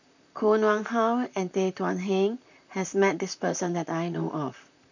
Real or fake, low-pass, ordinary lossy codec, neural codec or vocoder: fake; 7.2 kHz; none; vocoder, 44.1 kHz, 128 mel bands, Pupu-Vocoder